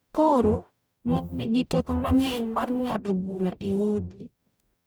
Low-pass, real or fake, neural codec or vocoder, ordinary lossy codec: none; fake; codec, 44.1 kHz, 0.9 kbps, DAC; none